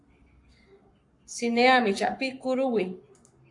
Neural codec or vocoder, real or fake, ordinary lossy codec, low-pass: codec, 44.1 kHz, 7.8 kbps, Pupu-Codec; fake; AAC, 64 kbps; 10.8 kHz